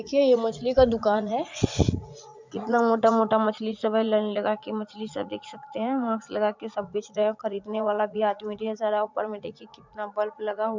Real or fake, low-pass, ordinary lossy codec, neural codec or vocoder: fake; 7.2 kHz; MP3, 64 kbps; vocoder, 44.1 kHz, 80 mel bands, Vocos